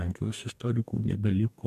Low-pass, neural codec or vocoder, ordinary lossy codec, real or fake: 14.4 kHz; codec, 44.1 kHz, 2.6 kbps, DAC; MP3, 96 kbps; fake